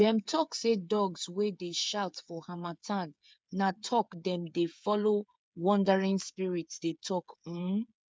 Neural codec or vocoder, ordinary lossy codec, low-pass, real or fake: codec, 16 kHz, 8 kbps, FreqCodec, smaller model; none; none; fake